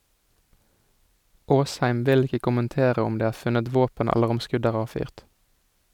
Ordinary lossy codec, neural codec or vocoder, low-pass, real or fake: none; none; 19.8 kHz; real